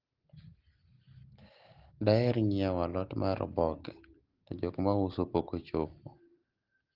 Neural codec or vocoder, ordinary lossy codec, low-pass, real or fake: none; Opus, 16 kbps; 5.4 kHz; real